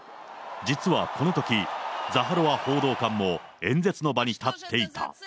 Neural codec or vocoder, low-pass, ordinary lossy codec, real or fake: none; none; none; real